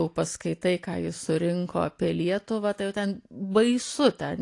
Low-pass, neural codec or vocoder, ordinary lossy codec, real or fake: 10.8 kHz; none; AAC, 48 kbps; real